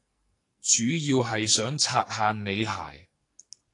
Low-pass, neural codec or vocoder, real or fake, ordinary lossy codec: 10.8 kHz; codec, 44.1 kHz, 2.6 kbps, SNAC; fake; AAC, 48 kbps